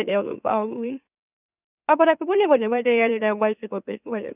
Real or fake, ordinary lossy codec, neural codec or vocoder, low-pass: fake; none; autoencoder, 44.1 kHz, a latent of 192 numbers a frame, MeloTTS; 3.6 kHz